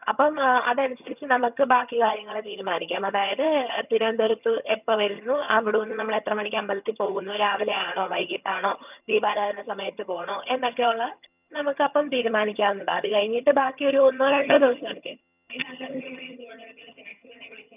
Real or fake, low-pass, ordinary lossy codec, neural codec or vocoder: fake; 3.6 kHz; none; vocoder, 22.05 kHz, 80 mel bands, HiFi-GAN